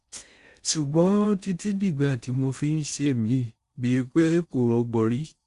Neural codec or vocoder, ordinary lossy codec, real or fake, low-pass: codec, 16 kHz in and 24 kHz out, 0.6 kbps, FocalCodec, streaming, 4096 codes; Opus, 64 kbps; fake; 10.8 kHz